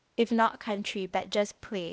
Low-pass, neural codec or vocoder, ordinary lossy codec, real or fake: none; codec, 16 kHz, 0.8 kbps, ZipCodec; none; fake